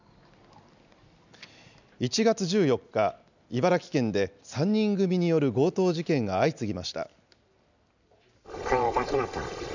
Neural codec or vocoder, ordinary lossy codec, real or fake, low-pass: none; none; real; 7.2 kHz